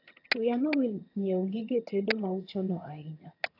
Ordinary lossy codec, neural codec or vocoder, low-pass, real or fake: AAC, 32 kbps; vocoder, 22.05 kHz, 80 mel bands, HiFi-GAN; 5.4 kHz; fake